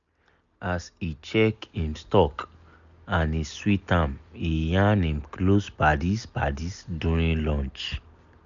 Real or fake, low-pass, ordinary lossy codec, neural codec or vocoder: real; 7.2 kHz; none; none